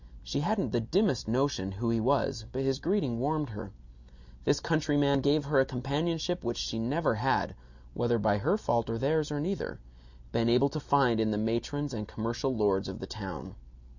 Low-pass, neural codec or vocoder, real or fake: 7.2 kHz; none; real